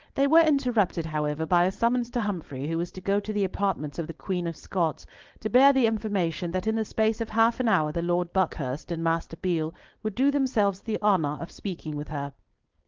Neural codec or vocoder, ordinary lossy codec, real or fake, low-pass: codec, 16 kHz, 4.8 kbps, FACodec; Opus, 16 kbps; fake; 7.2 kHz